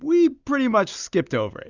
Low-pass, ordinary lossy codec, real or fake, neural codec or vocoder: 7.2 kHz; Opus, 64 kbps; real; none